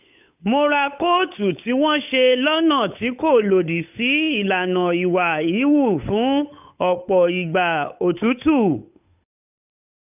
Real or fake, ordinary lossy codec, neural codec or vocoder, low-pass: fake; none; codec, 16 kHz, 8 kbps, FunCodec, trained on Chinese and English, 25 frames a second; 3.6 kHz